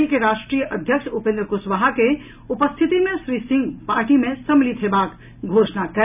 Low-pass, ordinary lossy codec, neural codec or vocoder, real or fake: 3.6 kHz; none; none; real